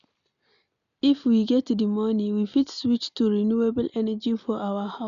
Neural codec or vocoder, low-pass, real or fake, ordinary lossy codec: none; 7.2 kHz; real; none